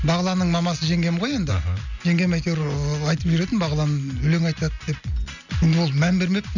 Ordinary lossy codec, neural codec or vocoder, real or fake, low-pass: none; none; real; 7.2 kHz